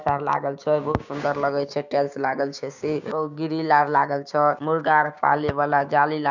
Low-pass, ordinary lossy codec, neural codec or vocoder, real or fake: 7.2 kHz; none; codec, 16 kHz, 6 kbps, DAC; fake